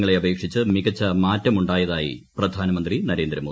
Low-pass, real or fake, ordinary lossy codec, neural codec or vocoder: none; real; none; none